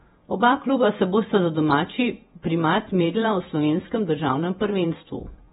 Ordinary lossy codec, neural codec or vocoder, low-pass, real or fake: AAC, 16 kbps; vocoder, 48 kHz, 128 mel bands, Vocos; 19.8 kHz; fake